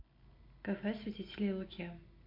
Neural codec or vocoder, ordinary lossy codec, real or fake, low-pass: none; MP3, 32 kbps; real; 5.4 kHz